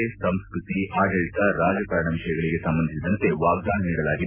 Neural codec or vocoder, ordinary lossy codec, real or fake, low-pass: none; MP3, 32 kbps; real; 3.6 kHz